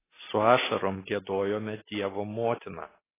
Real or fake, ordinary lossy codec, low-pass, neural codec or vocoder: real; AAC, 16 kbps; 3.6 kHz; none